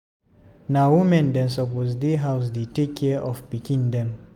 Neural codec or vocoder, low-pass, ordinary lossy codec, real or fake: none; 19.8 kHz; none; real